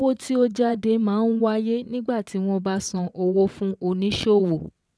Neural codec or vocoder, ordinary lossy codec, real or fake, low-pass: vocoder, 22.05 kHz, 80 mel bands, WaveNeXt; none; fake; none